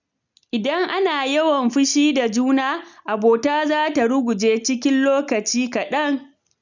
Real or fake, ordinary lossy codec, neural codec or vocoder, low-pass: real; none; none; 7.2 kHz